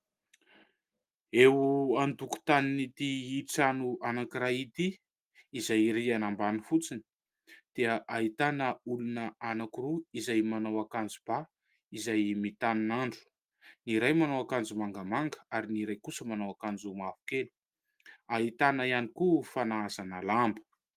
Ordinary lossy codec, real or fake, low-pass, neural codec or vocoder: Opus, 32 kbps; real; 14.4 kHz; none